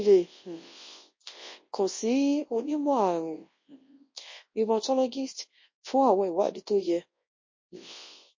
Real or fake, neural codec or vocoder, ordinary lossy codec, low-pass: fake; codec, 24 kHz, 0.9 kbps, WavTokenizer, large speech release; MP3, 32 kbps; 7.2 kHz